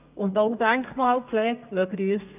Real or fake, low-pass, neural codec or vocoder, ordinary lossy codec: fake; 3.6 kHz; codec, 32 kHz, 1.9 kbps, SNAC; none